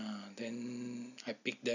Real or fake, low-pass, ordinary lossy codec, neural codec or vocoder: real; 7.2 kHz; none; none